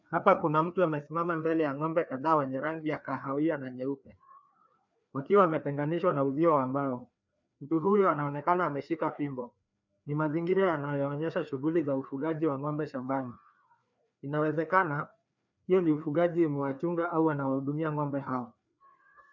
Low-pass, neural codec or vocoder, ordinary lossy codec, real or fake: 7.2 kHz; codec, 16 kHz, 2 kbps, FreqCodec, larger model; MP3, 64 kbps; fake